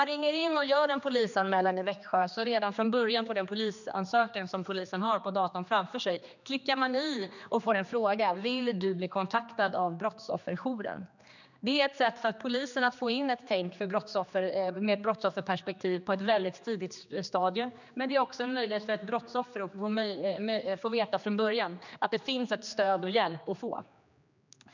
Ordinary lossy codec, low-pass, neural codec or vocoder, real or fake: none; 7.2 kHz; codec, 16 kHz, 2 kbps, X-Codec, HuBERT features, trained on general audio; fake